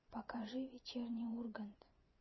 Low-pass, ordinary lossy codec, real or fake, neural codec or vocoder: 7.2 kHz; MP3, 24 kbps; fake; vocoder, 24 kHz, 100 mel bands, Vocos